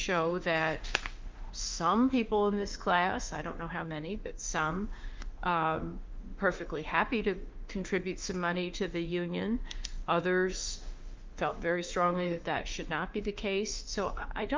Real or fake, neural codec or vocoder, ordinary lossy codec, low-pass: fake; autoencoder, 48 kHz, 32 numbers a frame, DAC-VAE, trained on Japanese speech; Opus, 32 kbps; 7.2 kHz